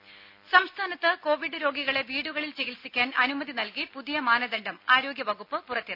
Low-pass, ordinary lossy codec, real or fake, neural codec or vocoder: 5.4 kHz; MP3, 48 kbps; real; none